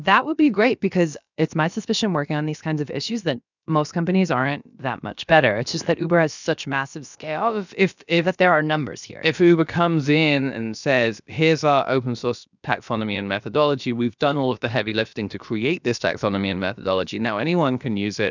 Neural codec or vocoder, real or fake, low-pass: codec, 16 kHz, about 1 kbps, DyCAST, with the encoder's durations; fake; 7.2 kHz